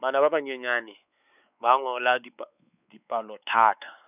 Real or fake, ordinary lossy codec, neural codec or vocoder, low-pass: fake; none; codec, 16 kHz, 4 kbps, X-Codec, WavLM features, trained on Multilingual LibriSpeech; 3.6 kHz